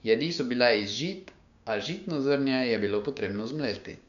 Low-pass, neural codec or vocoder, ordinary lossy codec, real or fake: 7.2 kHz; codec, 16 kHz, 6 kbps, DAC; none; fake